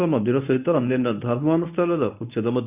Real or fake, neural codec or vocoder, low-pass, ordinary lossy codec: fake; codec, 24 kHz, 0.9 kbps, WavTokenizer, medium speech release version 1; 3.6 kHz; none